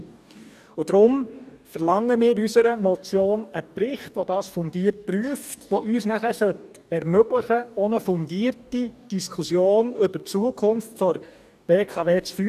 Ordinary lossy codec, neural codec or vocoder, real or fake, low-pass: none; codec, 44.1 kHz, 2.6 kbps, DAC; fake; 14.4 kHz